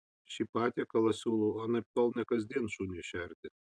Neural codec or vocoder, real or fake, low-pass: none; real; 9.9 kHz